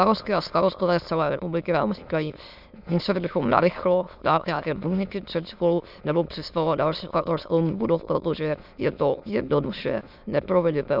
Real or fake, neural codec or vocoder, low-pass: fake; autoencoder, 22.05 kHz, a latent of 192 numbers a frame, VITS, trained on many speakers; 5.4 kHz